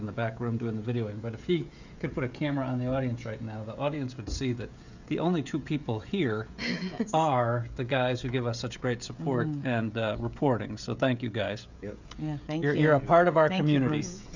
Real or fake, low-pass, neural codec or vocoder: fake; 7.2 kHz; codec, 16 kHz, 16 kbps, FreqCodec, smaller model